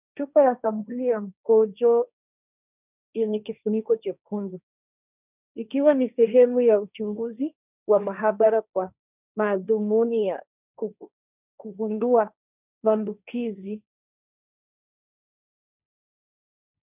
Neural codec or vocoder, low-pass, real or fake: codec, 16 kHz, 1.1 kbps, Voila-Tokenizer; 3.6 kHz; fake